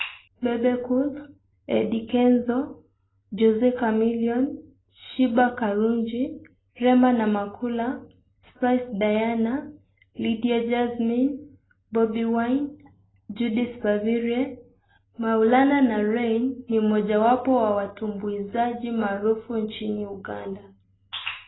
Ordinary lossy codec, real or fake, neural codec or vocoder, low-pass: AAC, 16 kbps; real; none; 7.2 kHz